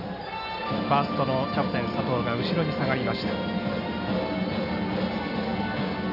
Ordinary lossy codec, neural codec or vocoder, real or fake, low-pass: none; none; real; 5.4 kHz